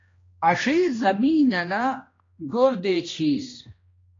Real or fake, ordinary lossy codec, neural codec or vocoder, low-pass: fake; AAC, 32 kbps; codec, 16 kHz, 1 kbps, X-Codec, HuBERT features, trained on general audio; 7.2 kHz